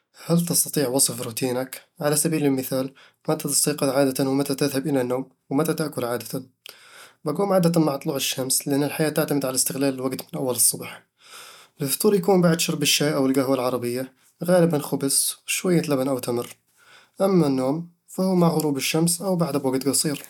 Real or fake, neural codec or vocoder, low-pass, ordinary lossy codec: real; none; 19.8 kHz; none